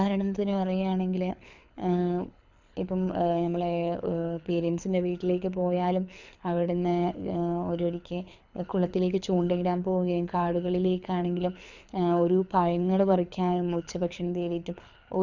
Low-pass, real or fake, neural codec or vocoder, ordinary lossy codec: 7.2 kHz; fake; codec, 24 kHz, 6 kbps, HILCodec; none